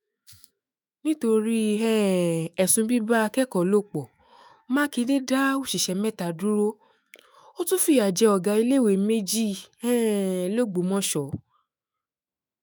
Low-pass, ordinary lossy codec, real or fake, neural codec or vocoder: none; none; fake; autoencoder, 48 kHz, 128 numbers a frame, DAC-VAE, trained on Japanese speech